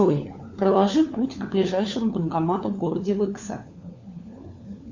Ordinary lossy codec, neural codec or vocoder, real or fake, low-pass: Opus, 64 kbps; codec, 16 kHz, 4 kbps, FunCodec, trained on LibriTTS, 50 frames a second; fake; 7.2 kHz